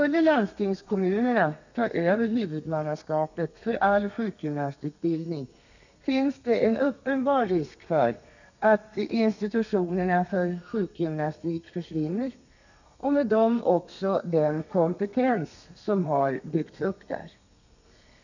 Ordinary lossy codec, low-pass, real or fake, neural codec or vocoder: none; 7.2 kHz; fake; codec, 32 kHz, 1.9 kbps, SNAC